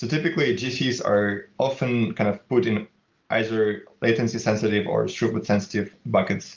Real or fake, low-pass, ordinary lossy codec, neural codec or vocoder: real; 7.2 kHz; Opus, 24 kbps; none